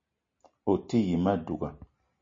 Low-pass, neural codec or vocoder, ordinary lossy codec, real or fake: 7.2 kHz; none; MP3, 32 kbps; real